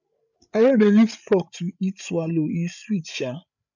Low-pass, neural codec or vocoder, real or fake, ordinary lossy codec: 7.2 kHz; codec, 16 kHz, 8 kbps, FreqCodec, larger model; fake; none